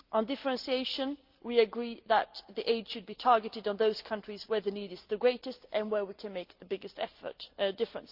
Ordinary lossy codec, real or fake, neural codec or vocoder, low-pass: Opus, 32 kbps; real; none; 5.4 kHz